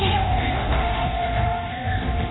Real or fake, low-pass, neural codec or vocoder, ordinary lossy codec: fake; 7.2 kHz; codec, 24 kHz, 0.9 kbps, DualCodec; AAC, 16 kbps